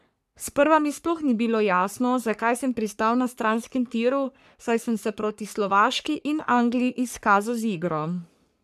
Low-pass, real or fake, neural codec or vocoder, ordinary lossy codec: 14.4 kHz; fake; codec, 44.1 kHz, 3.4 kbps, Pupu-Codec; none